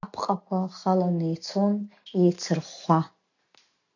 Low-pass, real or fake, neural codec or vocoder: 7.2 kHz; real; none